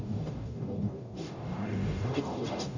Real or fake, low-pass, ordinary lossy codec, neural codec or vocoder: fake; 7.2 kHz; none; codec, 44.1 kHz, 0.9 kbps, DAC